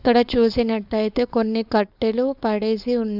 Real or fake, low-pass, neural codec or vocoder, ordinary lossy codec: fake; 5.4 kHz; codec, 16 kHz, 4.8 kbps, FACodec; none